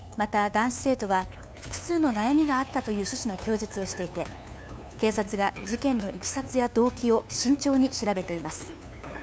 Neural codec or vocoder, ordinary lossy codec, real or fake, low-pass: codec, 16 kHz, 2 kbps, FunCodec, trained on LibriTTS, 25 frames a second; none; fake; none